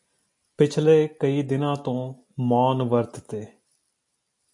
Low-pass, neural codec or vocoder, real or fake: 10.8 kHz; none; real